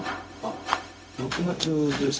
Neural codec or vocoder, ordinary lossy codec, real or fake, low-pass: codec, 16 kHz, 0.4 kbps, LongCat-Audio-Codec; none; fake; none